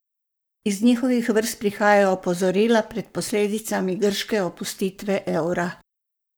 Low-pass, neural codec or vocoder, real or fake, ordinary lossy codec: none; codec, 44.1 kHz, 7.8 kbps, Pupu-Codec; fake; none